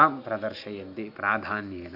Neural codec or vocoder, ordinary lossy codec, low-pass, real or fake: none; none; 5.4 kHz; real